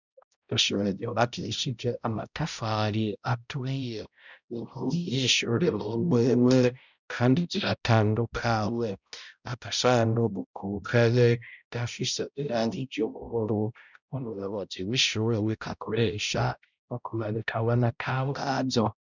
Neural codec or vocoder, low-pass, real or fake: codec, 16 kHz, 0.5 kbps, X-Codec, HuBERT features, trained on balanced general audio; 7.2 kHz; fake